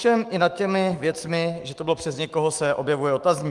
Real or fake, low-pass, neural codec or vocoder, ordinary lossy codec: fake; 10.8 kHz; autoencoder, 48 kHz, 128 numbers a frame, DAC-VAE, trained on Japanese speech; Opus, 24 kbps